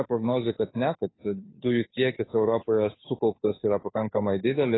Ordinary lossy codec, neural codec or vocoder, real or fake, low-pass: AAC, 16 kbps; codec, 16 kHz, 2 kbps, FunCodec, trained on Chinese and English, 25 frames a second; fake; 7.2 kHz